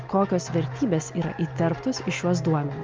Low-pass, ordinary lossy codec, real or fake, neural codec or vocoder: 7.2 kHz; Opus, 24 kbps; real; none